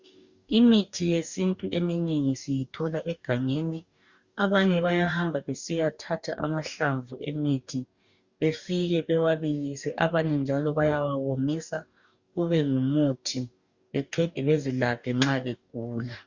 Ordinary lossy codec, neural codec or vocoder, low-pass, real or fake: Opus, 64 kbps; codec, 44.1 kHz, 2.6 kbps, DAC; 7.2 kHz; fake